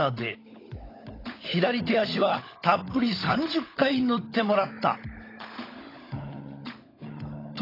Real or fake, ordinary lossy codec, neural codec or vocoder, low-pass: fake; AAC, 24 kbps; codec, 16 kHz, 16 kbps, FunCodec, trained on LibriTTS, 50 frames a second; 5.4 kHz